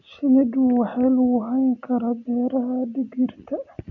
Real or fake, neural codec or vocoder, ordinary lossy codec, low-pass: real; none; none; 7.2 kHz